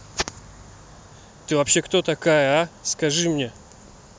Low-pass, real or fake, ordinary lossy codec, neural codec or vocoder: none; real; none; none